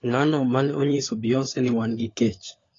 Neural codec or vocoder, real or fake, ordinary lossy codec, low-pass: codec, 16 kHz, 4 kbps, FunCodec, trained on LibriTTS, 50 frames a second; fake; AAC, 32 kbps; 7.2 kHz